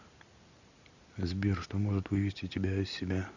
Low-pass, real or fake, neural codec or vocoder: 7.2 kHz; real; none